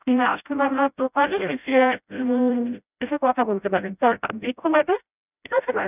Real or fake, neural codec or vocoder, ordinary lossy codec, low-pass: fake; codec, 16 kHz, 0.5 kbps, FreqCodec, smaller model; none; 3.6 kHz